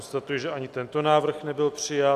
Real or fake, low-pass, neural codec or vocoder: real; 14.4 kHz; none